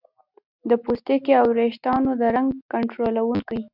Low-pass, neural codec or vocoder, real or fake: 5.4 kHz; none; real